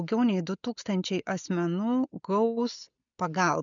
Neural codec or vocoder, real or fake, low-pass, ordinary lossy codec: none; real; 7.2 kHz; MP3, 96 kbps